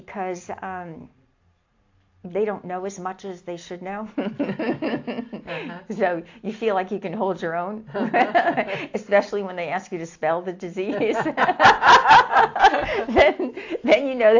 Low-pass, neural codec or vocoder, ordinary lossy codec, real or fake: 7.2 kHz; none; AAC, 48 kbps; real